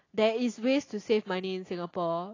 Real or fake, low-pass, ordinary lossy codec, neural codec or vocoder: real; 7.2 kHz; AAC, 32 kbps; none